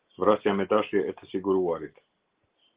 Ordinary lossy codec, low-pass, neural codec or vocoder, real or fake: Opus, 16 kbps; 3.6 kHz; none; real